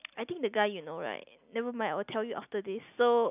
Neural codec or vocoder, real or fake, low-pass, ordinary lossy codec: none; real; 3.6 kHz; none